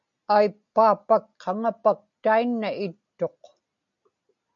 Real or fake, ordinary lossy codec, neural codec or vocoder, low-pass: real; MP3, 96 kbps; none; 7.2 kHz